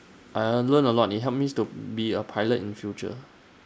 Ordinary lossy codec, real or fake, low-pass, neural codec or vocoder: none; real; none; none